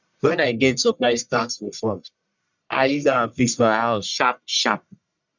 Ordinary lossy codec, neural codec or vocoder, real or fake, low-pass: none; codec, 44.1 kHz, 1.7 kbps, Pupu-Codec; fake; 7.2 kHz